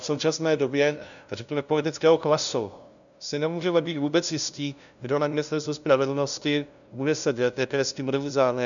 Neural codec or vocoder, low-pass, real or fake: codec, 16 kHz, 0.5 kbps, FunCodec, trained on LibriTTS, 25 frames a second; 7.2 kHz; fake